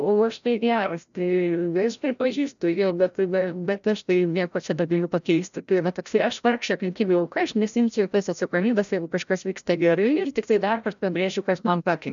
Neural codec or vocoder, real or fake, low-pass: codec, 16 kHz, 0.5 kbps, FreqCodec, larger model; fake; 7.2 kHz